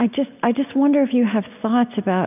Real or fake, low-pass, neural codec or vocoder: real; 3.6 kHz; none